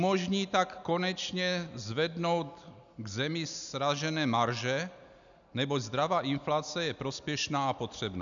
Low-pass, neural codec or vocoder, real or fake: 7.2 kHz; none; real